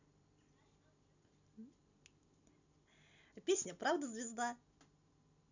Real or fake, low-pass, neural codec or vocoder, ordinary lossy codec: real; 7.2 kHz; none; none